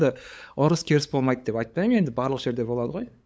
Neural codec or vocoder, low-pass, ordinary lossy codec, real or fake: codec, 16 kHz, 8 kbps, FunCodec, trained on LibriTTS, 25 frames a second; none; none; fake